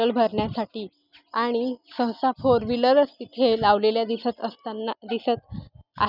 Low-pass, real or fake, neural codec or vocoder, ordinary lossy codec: 5.4 kHz; real; none; none